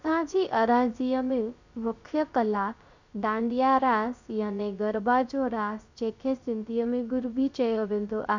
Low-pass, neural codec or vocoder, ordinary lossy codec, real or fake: 7.2 kHz; codec, 16 kHz, 0.3 kbps, FocalCodec; none; fake